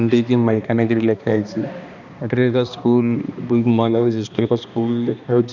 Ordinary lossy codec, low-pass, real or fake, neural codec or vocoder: none; 7.2 kHz; fake; codec, 16 kHz, 2 kbps, X-Codec, HuBERT features, trained on balanced general audio